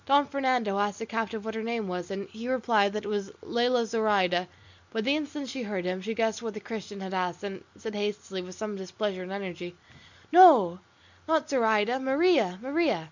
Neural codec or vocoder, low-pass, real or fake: none; 7.2 kHz; real